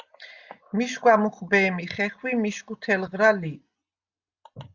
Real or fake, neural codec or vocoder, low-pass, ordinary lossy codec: real; none; 7.2 kHz; Opus, 64 kbps